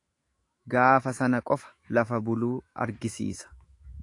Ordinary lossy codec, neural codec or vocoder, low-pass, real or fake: AAC, 48 kbps; autoencoder, 48 kHz, 128 numbers a frame, DAC-VAE, trained on Japanese speech; 10.8 kHz; fake